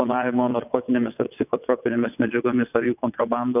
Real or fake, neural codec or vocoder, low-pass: fake; vocoder, 22.05 kHz, 80 mel bands, WaveNeXt; 3.6 kHz